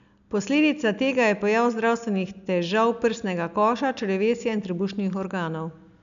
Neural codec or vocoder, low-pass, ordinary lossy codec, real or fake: none; 7.2 kHz; none; real